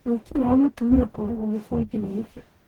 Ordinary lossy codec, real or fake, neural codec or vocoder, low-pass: Opus, 16 kbps; fake; codec, 44.1 kHz, 0.9 kbps, DAC; 19.8 kHz